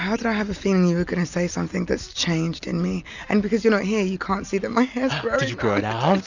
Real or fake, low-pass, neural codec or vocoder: real; 7.2 kHz; none